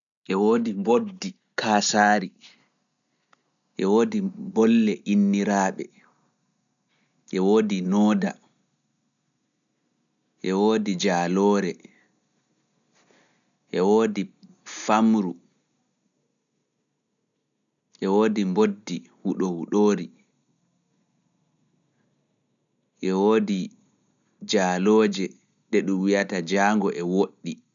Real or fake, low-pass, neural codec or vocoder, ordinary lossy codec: real; 7.2 kHz; none; none